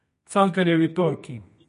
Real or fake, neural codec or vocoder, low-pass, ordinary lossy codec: fake; codec, 24 kHz, 0.9 kbps, WavTokenizer, medium music audio release; 10.8 kHz; MP3, 48 kbps